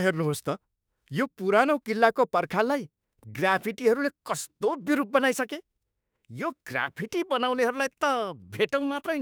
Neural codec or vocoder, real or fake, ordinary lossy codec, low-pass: autoencoder, 48 kHz, 32 numbers a frame, DAC-VAE, trained on Japanese speech; fake; none; none